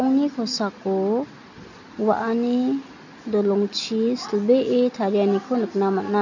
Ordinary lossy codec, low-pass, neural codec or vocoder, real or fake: none; 7.2 kHz; none; real